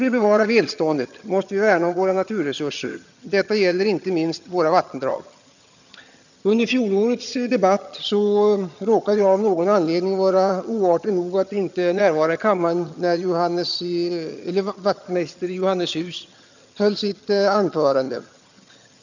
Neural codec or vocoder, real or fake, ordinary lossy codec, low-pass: vocoder, 22.05 kHz, 80 mel bands, HiFi-GAN; fake; none; 7.2 kHz